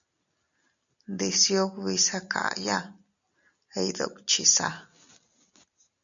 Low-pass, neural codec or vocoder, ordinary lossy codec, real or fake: 7.2 kHz; none; MP3, 96 kbps; real